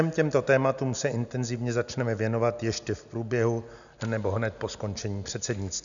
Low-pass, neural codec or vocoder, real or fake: 7.2 kHz; none; real